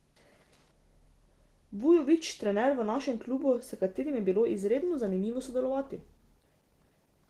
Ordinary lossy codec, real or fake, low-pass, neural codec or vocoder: Opus, 16 kbps; real; 14.4 kHz; none